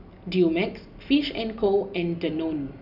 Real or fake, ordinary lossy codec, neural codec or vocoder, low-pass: real; none; none; 5.4 kHz